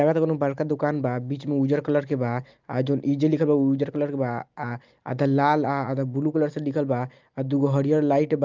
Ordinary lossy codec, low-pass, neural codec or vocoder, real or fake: Opus, 24 kbps; 7.2 kHz; none; real